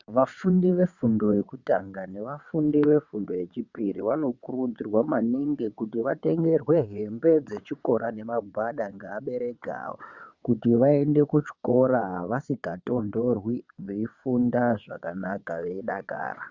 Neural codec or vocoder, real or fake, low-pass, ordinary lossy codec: vocoder, 22.05 kHz, 80 mel bands, WaveNeXt; fake; 7.2 kHz; Opus, 64 kbps